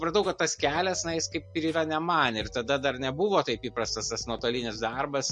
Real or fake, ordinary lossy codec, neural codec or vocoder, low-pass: real; MP3, 48 kbps; none; 10.8 kHz